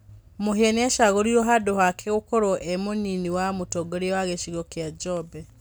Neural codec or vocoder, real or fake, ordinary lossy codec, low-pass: none; real; none; none